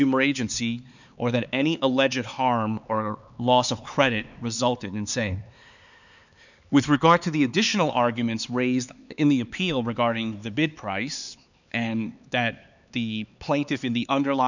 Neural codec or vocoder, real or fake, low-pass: codec, 16 kHz, 4 kbps, X-Codec, HuBERT features, trained on LibriSpeech; fake; 7.2 kHz